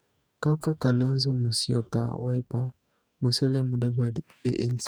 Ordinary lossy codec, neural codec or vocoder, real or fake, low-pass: none; codec, 44.1 kHz, 2.6 kbps, DAC; fake; none